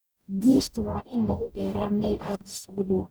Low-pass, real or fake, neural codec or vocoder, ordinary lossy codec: none; fake; codec, 44.1 kHz, 0.9 kbps, DAC; none